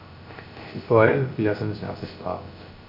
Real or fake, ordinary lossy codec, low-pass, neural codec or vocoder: fake; none; 5.4 kHz; codec, 16 kHz, 0.3 kbps, FocalCodec